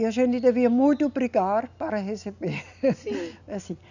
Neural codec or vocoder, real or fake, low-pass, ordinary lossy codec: none; real; 7.2 kHz; none